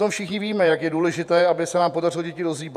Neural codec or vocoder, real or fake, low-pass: vocoder, 48 kHz, 128 mel bands, Vocos; fake; 14.4 kHz